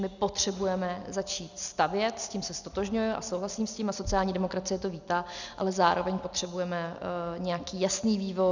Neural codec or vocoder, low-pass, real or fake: none; 7.2 kHz; real